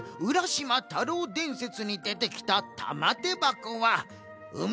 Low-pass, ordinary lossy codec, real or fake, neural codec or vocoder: none; none; real; none